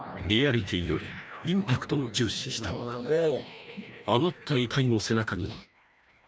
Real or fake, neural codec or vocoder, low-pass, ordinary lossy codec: fake; codec, 16 kHz, 1 kbps, FreqCodec, larger model; none; none